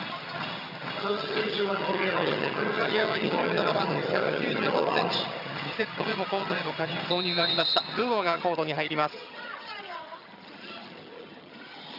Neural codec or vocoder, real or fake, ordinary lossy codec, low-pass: vocoder, 22.05 kHz, 80 mel bands, HiFi-GAN; fake; none; 5.4 kHz